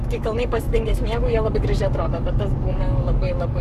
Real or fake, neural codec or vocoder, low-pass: fake; codec, 44.1 kHz, 7.8 kbps, Pupu-Codec; 14.4 kHz